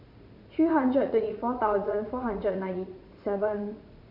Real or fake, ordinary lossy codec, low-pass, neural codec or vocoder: fake; MP3, 48 kbps; 5.4 kHz; vocoder, 44.1 kHz, 80 mel bands, Vocos